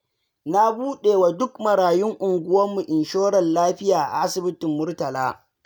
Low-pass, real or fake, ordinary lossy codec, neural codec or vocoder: none; real; none; none